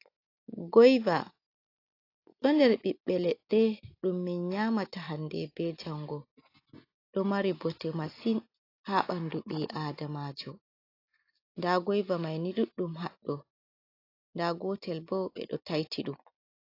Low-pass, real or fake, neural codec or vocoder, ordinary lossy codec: 5.4 kHz; real; none; AAC, 32 kbps